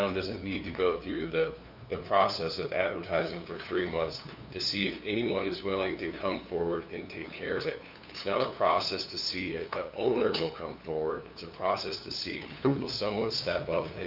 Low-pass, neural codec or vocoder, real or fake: 5.4 kHz; codec, 16 kHz, 2 kbps, FunCodec, trained on LibriTTS, 25 frames a second; fake